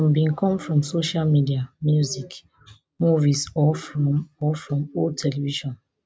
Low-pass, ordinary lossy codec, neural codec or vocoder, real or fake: none; none; none; real